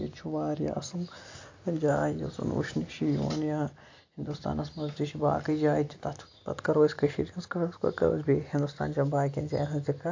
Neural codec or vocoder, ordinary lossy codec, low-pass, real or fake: none; AAC, 48 kbps; 7.2 kHz; real